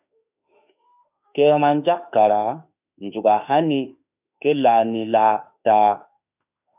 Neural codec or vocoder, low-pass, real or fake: autoencoder, 48 kHz, 32 numbers a frame, DAC-VAE, trained on Japanese speech; 3.6 kHz; fake